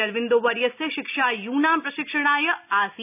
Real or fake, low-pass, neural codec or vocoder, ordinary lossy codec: real; 3.6 kHz; none; none